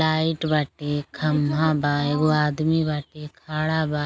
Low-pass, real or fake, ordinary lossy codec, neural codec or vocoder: none; real; none; none